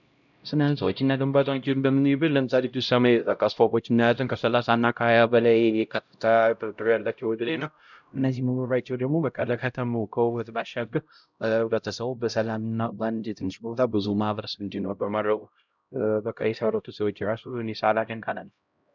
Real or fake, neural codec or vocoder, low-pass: fake; codec, 16 kHz, 0.5 kbps, X-Codec, HuBERT features, trained on LibriSpeech; 7.2 kHz